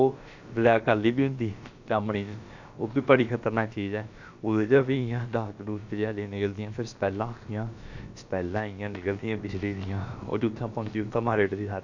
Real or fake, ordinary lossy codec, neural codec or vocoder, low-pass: fake; none; codec, 16 kHz, about 1 kbps, DyCAST, with the encoder's durations; 7.2 kHz